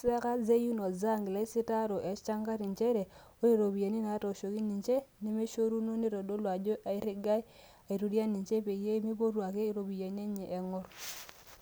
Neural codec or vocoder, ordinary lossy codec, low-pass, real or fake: none; none; none; real